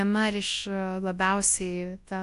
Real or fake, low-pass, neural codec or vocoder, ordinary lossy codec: fake; 10.8 kHz; codec, 24 kHz, 0.9 kbps, WavTokenizer, large speech release; AAC, 64 kbps